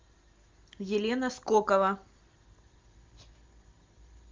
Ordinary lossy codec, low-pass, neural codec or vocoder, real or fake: Opus, 32 kbps; 7.2 kHz; none; real